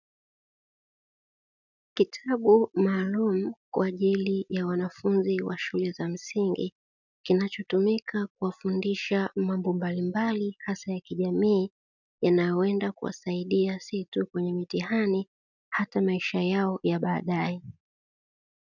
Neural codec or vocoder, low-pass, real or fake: none; 7.2 kHz; real